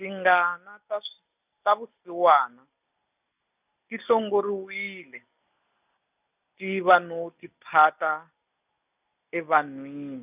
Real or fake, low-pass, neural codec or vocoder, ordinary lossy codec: real; 3.6 kHz; none; none